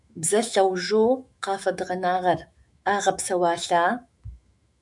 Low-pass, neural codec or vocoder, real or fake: 10.8 kHz; autoencoder, 48 kHz, 128 numbers a frame, DAC-VAE, trained on Japanese speech; fake